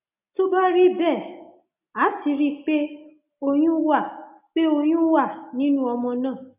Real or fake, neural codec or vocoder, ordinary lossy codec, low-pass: real; none; none; 3.6 kHz